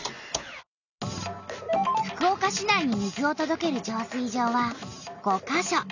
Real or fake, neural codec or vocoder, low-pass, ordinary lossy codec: real; none; 7.2 kHz; none